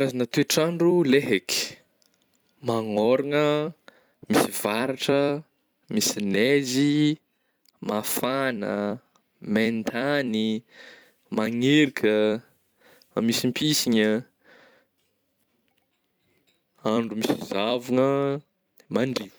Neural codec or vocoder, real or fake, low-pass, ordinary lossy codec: vocoder, 44.1 kHz, 128 mel bands every 256 samples, BigVGAN v2; fake; none; none